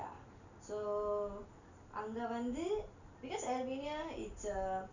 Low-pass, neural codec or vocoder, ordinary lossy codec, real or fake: 7.2 kHz; none; none; real